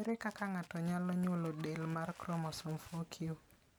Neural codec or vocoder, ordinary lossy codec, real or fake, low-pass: none; none; real; none